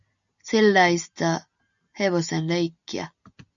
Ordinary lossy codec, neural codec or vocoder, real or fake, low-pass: MP3, 96 kbps; none; real; 7.2 kHz